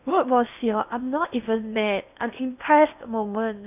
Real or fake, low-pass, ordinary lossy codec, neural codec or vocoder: fake; 3.6 kHz; none; codec, 16 kHz in and 24 kHz out, 0.8 kbps, FocalCodec, streaming, 65536 codes